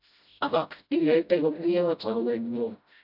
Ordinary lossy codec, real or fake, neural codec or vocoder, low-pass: none; fake; codec, 16 kHz, 0.5 kbps, FreqCodec, smaller model; 5.4 kHz